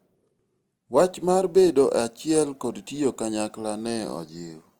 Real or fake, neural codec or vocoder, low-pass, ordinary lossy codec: real; none; 19.8 kHz; Opus, 24 kbps